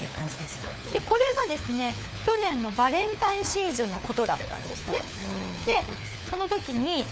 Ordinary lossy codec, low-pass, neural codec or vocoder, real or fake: none; none; codec, 16 kHz, 4 kbps, FunCodec, trained on LibriTTS, 50 frames a second; fake